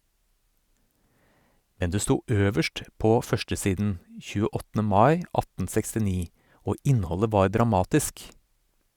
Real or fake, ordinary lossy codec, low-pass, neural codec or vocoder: real; Opus, 64 kbps; 19.8 kHz; none